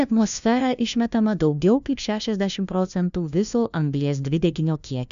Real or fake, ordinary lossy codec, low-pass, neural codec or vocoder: fake; AAC, 96 kbps; 7.2 kHz; codec, 16 kHz, 1 kbps, FunCodec, trained on LibriTTS, 50 frames a second